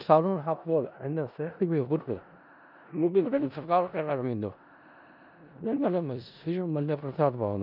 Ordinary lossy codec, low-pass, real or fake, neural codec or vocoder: none; 5.4 kHz; fake; codec, 16 kHz in and 24 kHz out, 0.4 kbps, LongCat-Audio-Codec, four codebook decoder